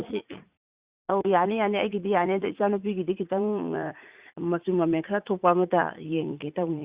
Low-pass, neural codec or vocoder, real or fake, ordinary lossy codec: 3.6 kHz; vocoder, 44.1 kHz, 80 mel bands, Vocos; fake; Opus, 64 kbps